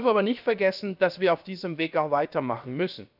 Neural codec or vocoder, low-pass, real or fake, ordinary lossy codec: codec, 16 kHz, about 1 kbps, DyCAST, with the encoder's durations; 5.4 kHz; fake; none